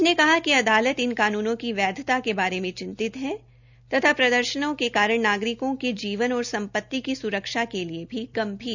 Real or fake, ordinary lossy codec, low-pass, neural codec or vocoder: real; none; 7.2 kHz; none